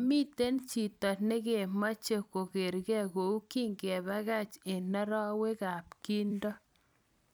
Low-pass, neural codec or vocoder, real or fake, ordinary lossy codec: none; vocoder, 44.1 kHz, 128 mel bands every 256 samples, BigVGAN v2; fake; none